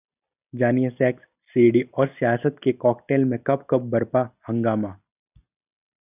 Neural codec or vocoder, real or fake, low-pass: none; real; 3.6 kHz